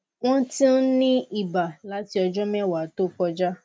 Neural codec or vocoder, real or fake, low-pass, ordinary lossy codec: none; real; none; none